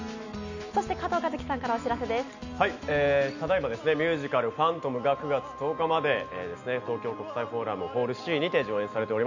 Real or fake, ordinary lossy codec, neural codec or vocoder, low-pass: real; none; none; 7.2 kHz